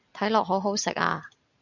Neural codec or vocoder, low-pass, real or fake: none; 7.2 kHz; real